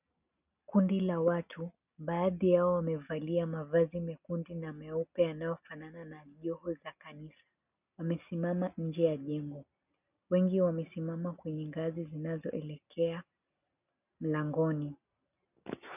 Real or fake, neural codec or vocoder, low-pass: real; none; 3.6 kHz